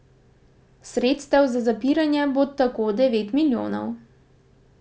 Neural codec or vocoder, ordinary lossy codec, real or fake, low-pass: none; none; real; none